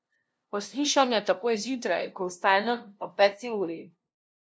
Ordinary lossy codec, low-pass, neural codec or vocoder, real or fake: none; none; codec, 16 kHz, 0.5 kbps, FunCodec, trained on LibriTTS, 25 frames a second; fake